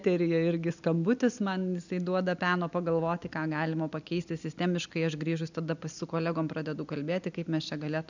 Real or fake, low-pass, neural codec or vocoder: real; 7.2 kHz; none